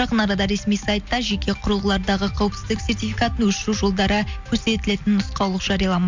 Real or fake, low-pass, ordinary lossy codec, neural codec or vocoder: real; 7.2 kHz; MP3, 64 kbps; none